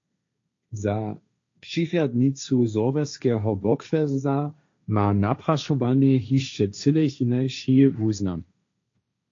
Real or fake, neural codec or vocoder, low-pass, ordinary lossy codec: fake; codec, 16 kHz, 1.1 kbps, Voila-Tokenizer; 7.2 kHz; AAC, 48 kbps